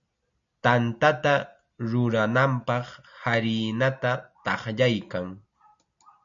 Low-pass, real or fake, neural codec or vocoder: 7.2 kHz; real; none